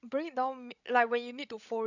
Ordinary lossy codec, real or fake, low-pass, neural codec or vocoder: none; fake; 7.2 kHz; codec, 16 kHz, 16 kbps, FreqCodec, larger model